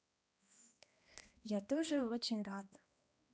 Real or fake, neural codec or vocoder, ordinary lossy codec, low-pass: fake; codec, 16 kHz, 1 kbps, X-Codec, HuBERT features, trained on balanced general audio; none; none